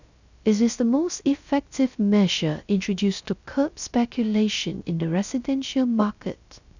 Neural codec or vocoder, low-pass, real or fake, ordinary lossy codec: codec, 16 kHz, 0.3 kbps, FocalCodec; 7.2 kHz; fake; none